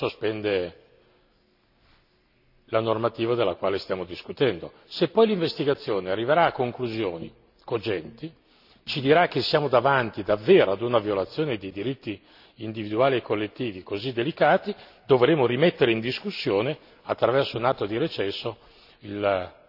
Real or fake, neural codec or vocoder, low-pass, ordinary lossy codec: real; none; 5.4 kHz; none